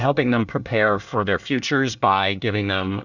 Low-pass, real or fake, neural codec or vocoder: 7.2 kHz; fake; codec, 24 kHz, 1 kbps, SNAC